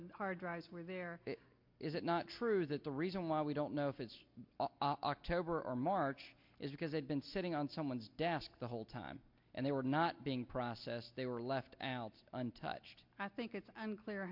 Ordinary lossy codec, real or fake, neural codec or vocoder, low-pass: MP3, 48 kbps; real; none; 5.4 kHz